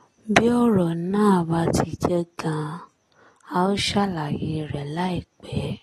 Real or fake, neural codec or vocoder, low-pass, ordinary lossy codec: real; none; 19.8 kHz; AAC, 32 kbps